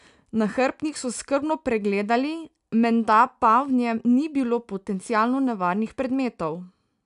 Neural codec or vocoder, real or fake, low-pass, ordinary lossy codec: none; real; 10.8 kHz; none